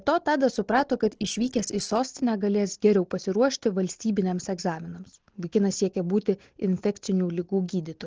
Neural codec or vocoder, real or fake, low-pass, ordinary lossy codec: none; real; 7.2 kHz; Opus, 16 kbps